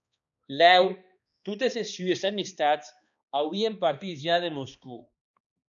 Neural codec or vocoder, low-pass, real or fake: codec, 16 kHz, 2 kbps, X-Codec, HuBERT features, trained on balanced general audio; 7.2 kHz; fake